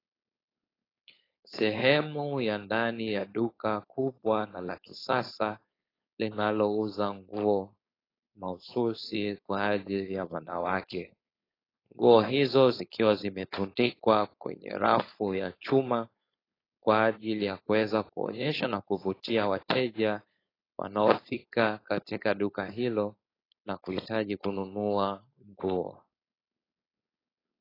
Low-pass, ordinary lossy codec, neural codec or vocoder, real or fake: 5.4 kHz; AAC, 24 kbps; codec, 16 kHz, 4.8 kbps, FACodec; fake